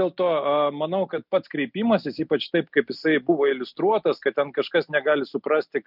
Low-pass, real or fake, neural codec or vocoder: 5.4 kHz; real; none